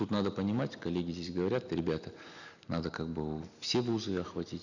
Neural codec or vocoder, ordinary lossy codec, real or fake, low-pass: none; none; real; 7.2 kHz